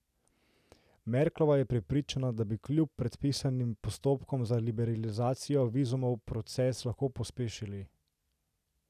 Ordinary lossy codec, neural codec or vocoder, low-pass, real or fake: none; none; 14.4 kHz; real